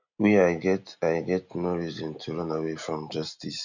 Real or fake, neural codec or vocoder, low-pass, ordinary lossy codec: real; none; 7.2 kHz; none